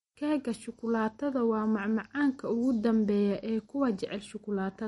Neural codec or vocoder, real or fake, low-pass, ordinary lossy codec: none; real; 19.8 kHz; MP3, 48 kbps